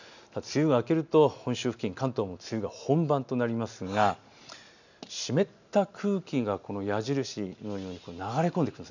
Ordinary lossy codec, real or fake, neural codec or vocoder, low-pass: none; real; none; 7.2 kHz